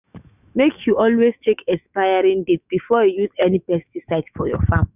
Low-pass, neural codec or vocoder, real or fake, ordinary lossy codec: 3.6 kHz; autoencoder, 48 kHz, 128 numbers a frame, DAC-VAE, trained on Japanese speech; fake; none